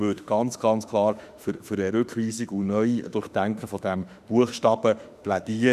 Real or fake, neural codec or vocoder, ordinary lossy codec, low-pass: fake; autoencoder, 48 kHz, 32 numbers a frame, DAC-VAE, trained on Japanese speech; none; 14.4 kHz